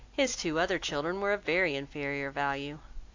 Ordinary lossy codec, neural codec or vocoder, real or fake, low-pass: AAC, 48 kbps; none; real; 7.2 kHz